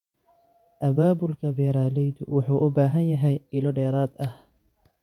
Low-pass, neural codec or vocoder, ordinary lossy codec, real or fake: 19.8 kHz; vocoder, 48 kHz, 128 mel bands, Vocos; MP3, 96 kbps; fake